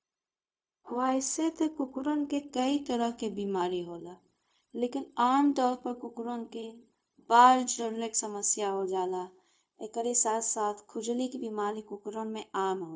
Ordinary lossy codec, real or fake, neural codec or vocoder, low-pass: none; fake; codec, 16 kHz, 0.4 kbps, LongCat-Audio-Codec; none